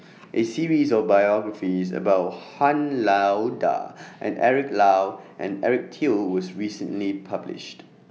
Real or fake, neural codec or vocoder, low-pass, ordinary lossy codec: real; none; none; none